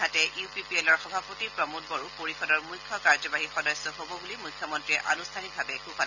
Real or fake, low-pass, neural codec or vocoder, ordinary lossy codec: real; 7.2 kHz; none; none